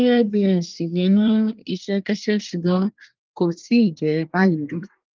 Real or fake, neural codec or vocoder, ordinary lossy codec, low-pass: fake; codec, 24 kHz, 1 kbps, SNAC; Opus, 32 kbps; 7.2 kHz